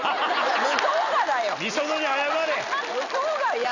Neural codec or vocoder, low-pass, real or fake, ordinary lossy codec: none; 7.2 kHz; real; none